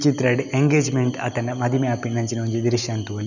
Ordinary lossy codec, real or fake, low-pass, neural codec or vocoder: none; real; 7.2 kHz; none